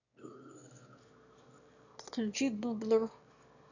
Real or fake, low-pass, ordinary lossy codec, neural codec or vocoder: fake; 7.2 kHz; none; autoencoder, 22.05 kHz, a latent of 192 numbers a frame, VITS, trained on one speaker